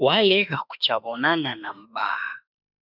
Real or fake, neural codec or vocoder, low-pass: fake; autoencoder, 48 kHz, 32 numbers a frame, DAC-VAE, trained on Japanese speech; 5.4 kHz